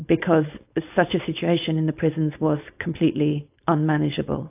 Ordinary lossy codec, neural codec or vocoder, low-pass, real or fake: AAC, 32 kbps; codec, 16 kHz, 4.8 kbps, FACodec; 3.6 kHz; fake